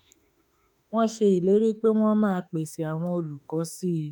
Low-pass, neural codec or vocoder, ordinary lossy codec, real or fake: 19.8 kHz; autoencoder, 48 kHz, 32 numbers a frame, DAC-VAE, trained on Japanese speech; none; fake